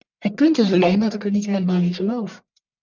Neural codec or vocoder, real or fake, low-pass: codec, 44.1 kHz, 1.7 kbps, Pupu-Codec; fake; 7.2 kHz